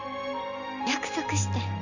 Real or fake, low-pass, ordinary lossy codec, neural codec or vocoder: real; 7.2 kHz; none; none